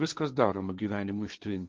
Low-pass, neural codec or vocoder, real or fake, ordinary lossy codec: 7.2 kHz; codec, 16 kHz, 1.1 kbps, Voila-Tokenizer; fake; Opus, 32 kbps